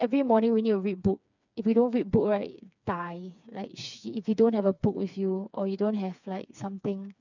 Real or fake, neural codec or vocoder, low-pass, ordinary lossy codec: fake; codec, 16 kHz, 4 kbps, FreqCodec, smaller model; 7.2 kHz; none